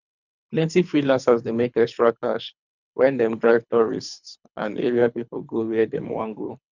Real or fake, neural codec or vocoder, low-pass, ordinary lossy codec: fake; codec, 24 kHz, 3 kbps, HILCodec; 7.2 kHz; none